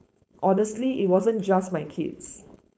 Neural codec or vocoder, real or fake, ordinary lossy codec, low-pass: codec, 16 kHz, 4.8 kbps, FACodec; fake; none; none